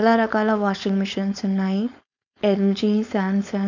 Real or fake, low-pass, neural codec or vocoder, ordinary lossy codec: fake; 7.2 kHz; codec, 16 kHz, 4.8 kbps, FACodec; none